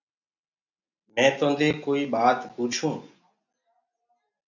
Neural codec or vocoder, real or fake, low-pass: none; real; 7.2 kHz